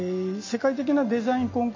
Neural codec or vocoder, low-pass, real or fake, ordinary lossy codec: none; 7.2 kHz; real; MP3, 32 kbps